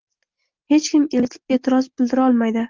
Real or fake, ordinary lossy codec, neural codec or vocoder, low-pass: real; Opus, 32 kbps; none; 7.2 kHz